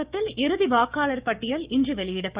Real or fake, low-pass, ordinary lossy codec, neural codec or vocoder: fake; 3.6 kHz; Opus, 64 kbps; codec, 16 kHz, 6 kbps, DAC